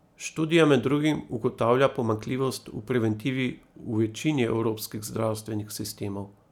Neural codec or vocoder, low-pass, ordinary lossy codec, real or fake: none; 19.8 kHz; none; real